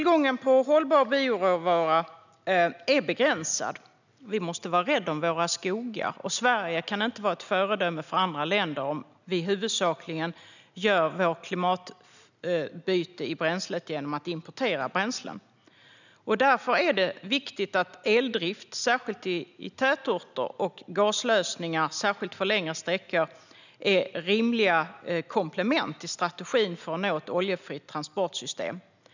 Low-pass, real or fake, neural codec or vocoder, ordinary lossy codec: 7.2 kHz; real; none; none